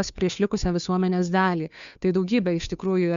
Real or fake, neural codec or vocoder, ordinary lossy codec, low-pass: fake; codec, 16 kHz, 2 kbps, FunCodec, trained on Chinese and English, 25 frames a second; Opus, 64 kbps; 7.2 kHz